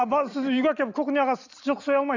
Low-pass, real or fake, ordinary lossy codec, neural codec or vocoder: 7.2 kHz; real; none; none